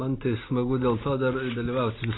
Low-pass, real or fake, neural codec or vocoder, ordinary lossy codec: 7.2 kHz; real; none; AAC, 16 kbps